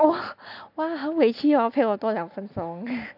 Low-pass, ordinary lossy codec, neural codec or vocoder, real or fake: 5.4 kHz; none; none; real